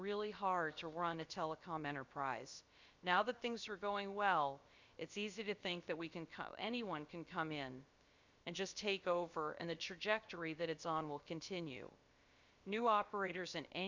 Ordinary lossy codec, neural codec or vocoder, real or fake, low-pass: Opus, 64 kbps; codec, 16 kHz, about 1 kbps, DyCAST, with the encoder's durations; fake; 7.2 kHz